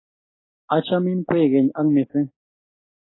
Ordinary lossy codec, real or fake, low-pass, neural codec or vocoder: AAC, 16 kbps; real; 7.2 kHz; none